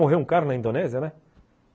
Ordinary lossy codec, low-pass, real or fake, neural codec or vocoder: none; none; real; none